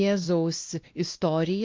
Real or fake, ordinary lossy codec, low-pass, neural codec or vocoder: fake; Opus, 24 kbps; 7.2 kHz; codec, 16 kHz, 1 kbps, X-Codec, WavLM features, trained on Multilingual LibriSpeech